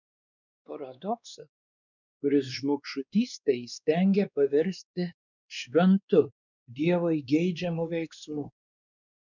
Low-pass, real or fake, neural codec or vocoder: 7.2 kHz; fake; codec, 16 kHz, 2 kbps, X-Codec, WavLM features, trained on Multilingual LibriSpeech